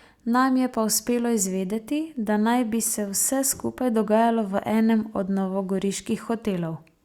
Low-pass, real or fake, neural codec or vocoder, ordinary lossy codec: 19.8 kHz; real; none; Opus, 64 kbps